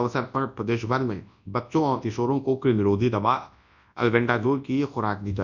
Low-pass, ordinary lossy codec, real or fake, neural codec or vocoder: 7.2 kHz; none; fake; codec, 24 kHz, 0.9 kbps, WavTokenizer, large speech release